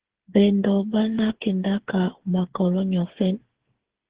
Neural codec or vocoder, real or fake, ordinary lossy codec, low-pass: codec, 16 kHz, 8 kbps, FreqCodec, smaller model; fake; Opus, 16 kbps; 3.6 kHz